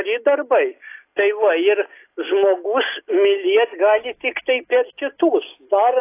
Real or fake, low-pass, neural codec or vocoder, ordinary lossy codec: real; 3.6 kHz; none; AAC, 24 kbps